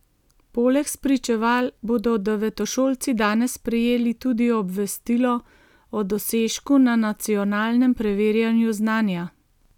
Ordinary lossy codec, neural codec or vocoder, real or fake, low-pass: none; none; real; 19.8 kHz